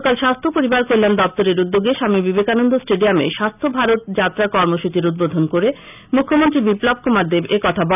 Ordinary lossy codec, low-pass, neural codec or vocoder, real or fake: none; 3.6 kHz; none; real